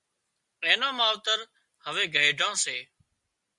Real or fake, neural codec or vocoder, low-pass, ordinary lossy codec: real; none; 10.8 kHz; Opus, 64 kbps